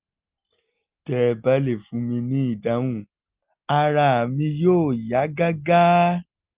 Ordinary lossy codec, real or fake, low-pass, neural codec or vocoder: Opus, 32 kbps; real; 3.6 kHz; none